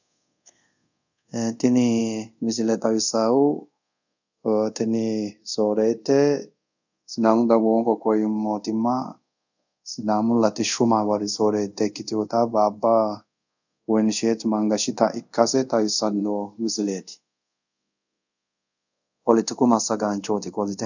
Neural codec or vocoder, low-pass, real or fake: codec, 24 kHz, 0.5 kbps, DualCodec; 7.2 kHz; fake